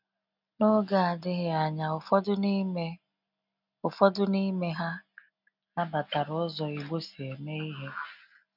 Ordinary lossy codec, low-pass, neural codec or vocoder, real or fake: none; 5.4 kHz; none; real